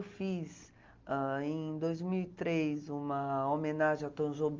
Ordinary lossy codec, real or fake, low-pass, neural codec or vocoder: Opus, 32 kbps; real; 7.2 kHz; none